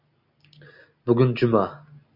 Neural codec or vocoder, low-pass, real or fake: none; 5.4 kHz; real